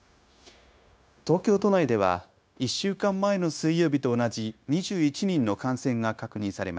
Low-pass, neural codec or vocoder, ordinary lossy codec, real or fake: none; codec, 16 kHz, 0.9 kbps, LongCat-Audio-Codec; none; fake